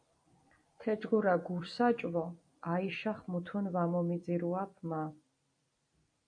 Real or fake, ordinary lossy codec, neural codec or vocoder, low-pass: fake; AAC, 64 kbps; vocoder, 44.1 kHz, 128 mel bands every 256 samples, BigVGAN v2; 9.9 kHz